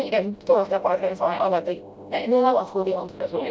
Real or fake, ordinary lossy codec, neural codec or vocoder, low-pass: fake; none; codec, 16 kHz, 0.5 kbps, FreqCodec, smaller model; none